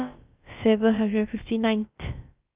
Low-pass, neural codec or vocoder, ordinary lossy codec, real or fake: 3.6 kHz; codec, 16 kHz, about 1 kbps, DyCAST, with the encoder's durations; Opus, 24 kbps; fake